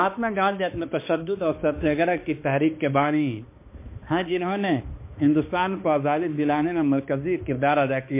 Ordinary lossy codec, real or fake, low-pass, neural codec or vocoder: MP3, 24 kbps; fake; 3.6 kHz; codec, 16 kHz, 2 kbps, X-Codec, HuBERT features, trained on balanced general audio